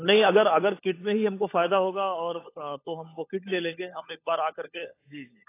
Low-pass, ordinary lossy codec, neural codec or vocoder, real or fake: 3.6 kHz; AAC, 24 kbps; codec, 16 kHz, 16 kbps, FunCodec, trained on LibriTTS, 50 frames a second; fake